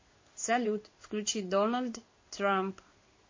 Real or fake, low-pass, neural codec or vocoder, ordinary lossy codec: fake; 7.2 kHz; codec, 16 kHz in and 24 kHz out, 1 kbps, XY-Tokenizer; MP3, 32 kbps